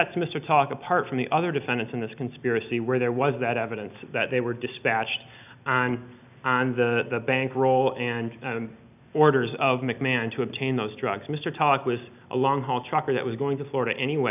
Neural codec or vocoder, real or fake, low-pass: none; real; 3.6 kHz